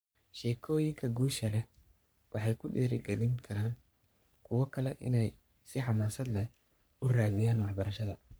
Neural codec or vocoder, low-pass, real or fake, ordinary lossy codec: codec, 44.1 kHz, 3.4 kbps, Pupu-Codec; none; fake; none